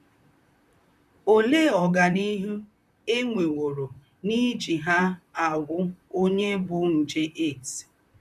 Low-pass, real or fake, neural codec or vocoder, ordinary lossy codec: 14.4 kHz; fake; vocoder, 44.1 kHz, 128 mel bands, Pupu-Vocoder; none